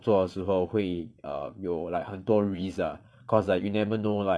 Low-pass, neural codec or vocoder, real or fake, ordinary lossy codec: none; vocoder, 22.05 kHz, 80 mel bands, Vocos; fake; none